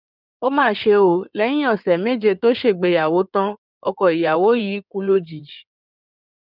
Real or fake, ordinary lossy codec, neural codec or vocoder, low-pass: fake; none; codec, 16 kHz in and 24 kHz out, 2.2 kbps, FireRedTTS-2 codec; 5.4 kHz